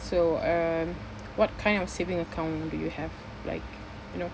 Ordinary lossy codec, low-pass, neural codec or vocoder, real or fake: none; none; none; real